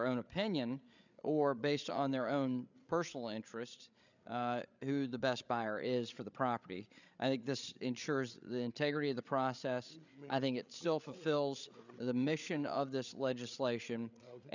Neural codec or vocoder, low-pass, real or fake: codec, 16 kHz, 8 kbps, FreqCodec, larger model; 7.2 kHz; fake